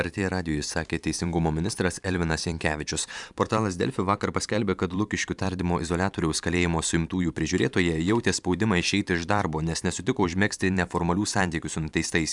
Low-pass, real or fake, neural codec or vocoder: 10.8 kHz; fake; vocoder, 44.1 kHz, 128 mel bands every 512 samples, BigVGAN v2